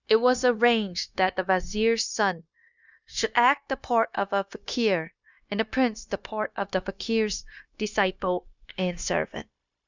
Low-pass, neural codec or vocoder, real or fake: 7.2 kHz; codec, 16 kHz, 0.9 kbps, LongCat-Audio-Codec; fake